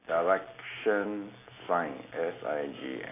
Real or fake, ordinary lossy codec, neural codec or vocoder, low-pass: real; AAC, 24 kbps; none; 3.6 kHz